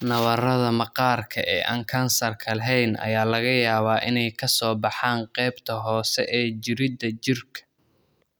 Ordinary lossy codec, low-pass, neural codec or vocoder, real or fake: none; none; none; real